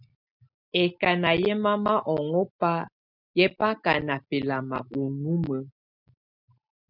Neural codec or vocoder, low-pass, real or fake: none; 5.4 kHz; real